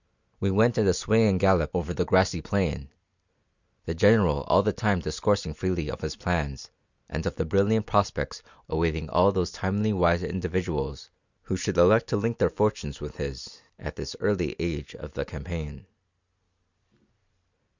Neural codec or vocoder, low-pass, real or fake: none; 7.2 kHz; real